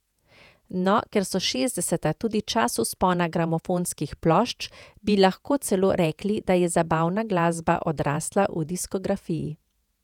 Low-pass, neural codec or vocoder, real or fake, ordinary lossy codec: 19.8 kHz; vocoder, 48 kHz, 128 mel bands, Vocos; fake; none